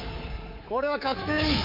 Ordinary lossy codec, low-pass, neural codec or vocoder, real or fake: none; 5.4 kHz; codec, 24 kHz, 3.1 kbps, DualCodec; fake